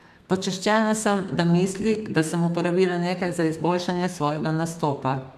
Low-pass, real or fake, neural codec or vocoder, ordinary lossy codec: 14.4 kHz; fake; codec, 32 kHz, 1.9 kbps, SNAC; none